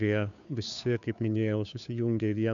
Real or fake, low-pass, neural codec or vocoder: fake; 7.2 kHz; codec, 16 kHz, 2 kbps, FunCodec, trained on Chinese and English, 25 frames a second